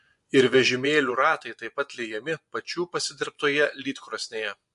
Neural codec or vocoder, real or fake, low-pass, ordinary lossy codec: vocoder, 24 kHz, 100 mel bands, Vocos; fake; 10.8 kHz; MP3, 64 kbps